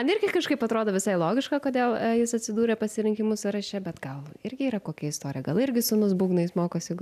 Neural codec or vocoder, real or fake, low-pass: none; real; 14.4 kHz